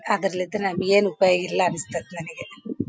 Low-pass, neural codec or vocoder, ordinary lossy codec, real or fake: none; none; none; real